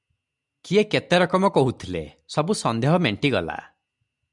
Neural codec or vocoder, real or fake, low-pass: none; real; 10.8 kHz